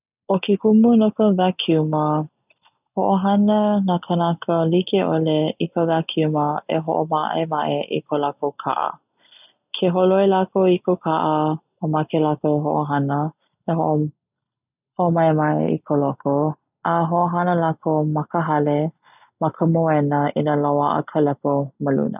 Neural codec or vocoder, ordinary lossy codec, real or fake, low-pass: none; none; real; 3.6 kHz